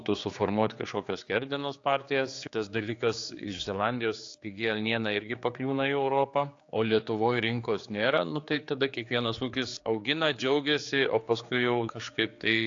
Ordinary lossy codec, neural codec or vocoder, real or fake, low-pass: AAC, 48 kbps; codec, 16 kHz, 4 kbps, X-Codec, HuBERT features, trained on general audio; fake; 7.2 kHz